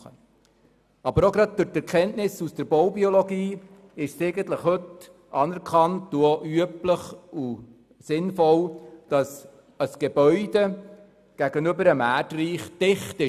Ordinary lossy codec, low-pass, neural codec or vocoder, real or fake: none; 14.4 kHz; none; real